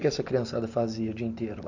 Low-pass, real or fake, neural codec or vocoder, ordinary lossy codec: 7.2 kHz; real; none; Opus, 64 kbps